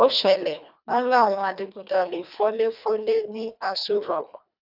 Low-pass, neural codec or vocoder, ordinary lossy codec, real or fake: 5.4 kHz; codec, 24 kHz, 1.5 kbps, HILCodec; none; fake